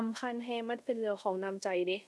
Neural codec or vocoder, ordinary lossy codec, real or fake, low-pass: codec, 24 kHz, 0.5 kbps, DualCodec; none; fake; none